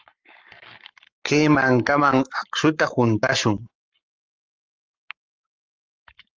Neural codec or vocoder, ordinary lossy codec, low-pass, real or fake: codec, 44.1 kHz, 7.8 kbps, Pupu-Codec; Opus, 24 kbps; 7.2 kHz; fake